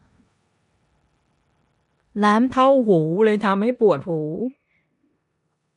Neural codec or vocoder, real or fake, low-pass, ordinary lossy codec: codec, 16 kHz in and 24 kHz out, 0.9 kbps, LongCat-Audio-Codec, four codebook decoder; fake; 10.8 kHz; none